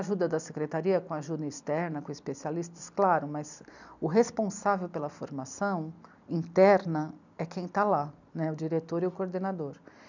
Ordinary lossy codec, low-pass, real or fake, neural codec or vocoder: none; 7.2 kHz; real; none